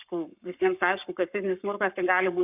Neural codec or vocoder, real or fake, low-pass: codec, 44.1 kHz, 7.8 kbps, Pupu-Codec; fake; 3.6 kHz